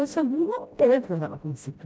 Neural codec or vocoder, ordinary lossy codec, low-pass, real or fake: codec, 16 kHz, 0.5 kbps, FreqCodec, smaller model; none; none; fake